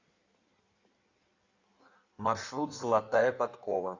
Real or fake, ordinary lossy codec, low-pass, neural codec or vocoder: fake; Opus, 64 kbps; 7.2 kHz; codec, 16 kHz in and 24 kHz out, 1.1 kbps, FireRedTTS-2 codec